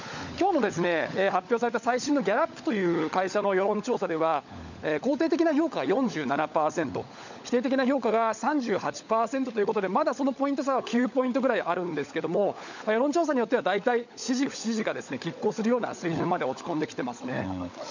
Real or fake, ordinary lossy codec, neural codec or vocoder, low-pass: fake; none; codec, 16 kHz, 16 kbps, FunCodec, trained on LibriTTS, 50 frames a second; 7.2 kHz